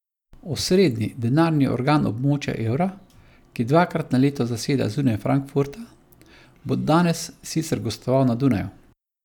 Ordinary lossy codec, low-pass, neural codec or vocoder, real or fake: none; 19.8 kHz; none; real